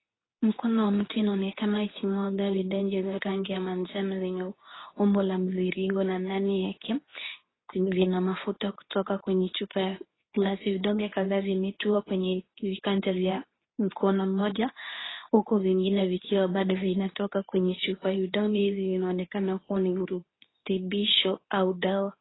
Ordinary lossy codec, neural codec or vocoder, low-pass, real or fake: AAC, 16 kbps; codec, 24 kHz, 0.9 kbps, WavTokenizer, medium speech release version 2; 7.2 kHz; fake